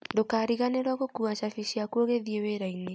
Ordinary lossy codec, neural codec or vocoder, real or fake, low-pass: none; none; real; none